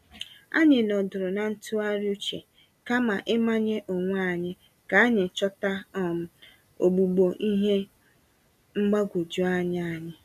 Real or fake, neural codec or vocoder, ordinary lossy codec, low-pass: real; none; none; 14.4 kHz